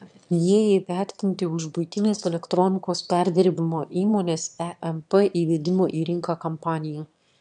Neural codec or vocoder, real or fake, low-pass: autoencoder, 22.05 kHz, a latent of 192 numbers a frame, VITS, trained on one speaker; fake; 9.9 kHz